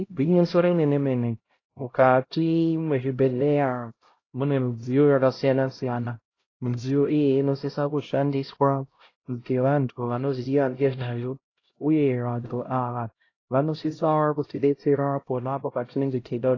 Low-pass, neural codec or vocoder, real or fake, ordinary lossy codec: 7.2 kHz; codec, 16 kHz, 0.5 kbps, X-Codec, HuBERT features, trained on LibriSpeech; fake; AAC, 32 kbps